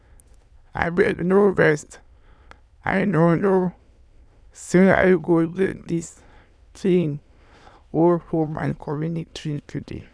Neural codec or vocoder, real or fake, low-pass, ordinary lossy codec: autoencoder, 22.05 kHz, a latent of 192 numbers a frame, VITS, trained on many speakers; fake; none; none